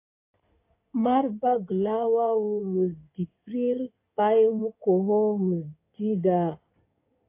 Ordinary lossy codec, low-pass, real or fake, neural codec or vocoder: AAC, 24 kbps; 3.6 kHz; fake; codec, 16 kHz in and 24 kHz out, 2.2 kbps, FireRedTTS-2 codec